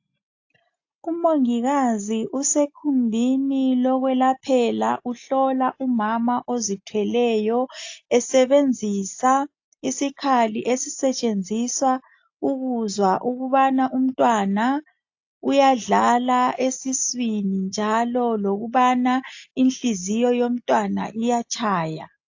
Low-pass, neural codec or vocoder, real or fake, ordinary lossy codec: 7.2 kHz; none; real; AAC, 48 kbps